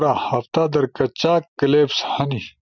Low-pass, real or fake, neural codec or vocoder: 7.2 kHz; real; none